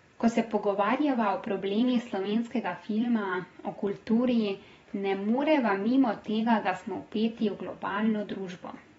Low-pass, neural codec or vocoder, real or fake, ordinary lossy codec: 19.8 kHz; vocoder, 44.1 kHz, 128 mel bands every 512 samples, BigVGAN v2; fake; AAC, 24 kbps